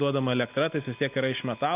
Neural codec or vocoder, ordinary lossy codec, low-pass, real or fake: none; Opus, 24 kbps; 3.6 kHz; real